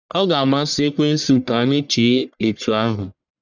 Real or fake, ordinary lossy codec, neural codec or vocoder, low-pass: fake; none; codec, 44.1 kHz, 1.7 kbps, Pupu-Codec; 7.2 kHz